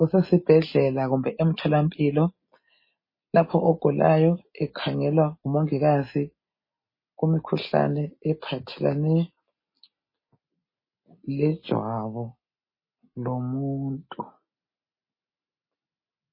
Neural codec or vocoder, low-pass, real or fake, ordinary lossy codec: none; 5.4 kHz; real; MP3, 24 kbps